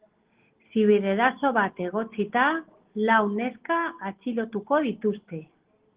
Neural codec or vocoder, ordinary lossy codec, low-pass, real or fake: none; Opus, 16 kbps; 3.6 kHz; real